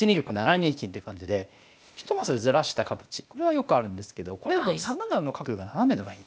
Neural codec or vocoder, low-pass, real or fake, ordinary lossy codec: codec, 16 kHz, 0.8 kbps, ZipCodec; none; fake; none